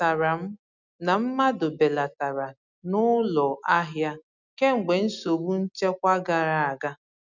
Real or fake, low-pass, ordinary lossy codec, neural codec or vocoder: real; 7.2 kHz; none; none